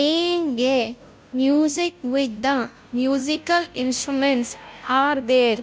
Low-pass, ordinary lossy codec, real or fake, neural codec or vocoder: none; none; fake; codec, 16 kHz, 0.5 kbps, FunCodec, trained on Chinese and English, 25 frames a second